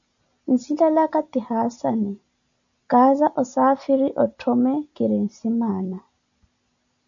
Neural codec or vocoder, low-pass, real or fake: none; 7.2 kHz; real